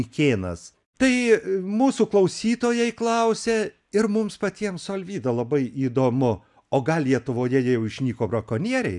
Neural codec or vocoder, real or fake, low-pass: none; real; 10.8 kHz